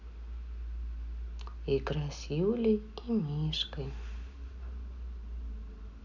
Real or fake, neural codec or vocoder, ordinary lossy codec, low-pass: real; none; none; 7.2 kHz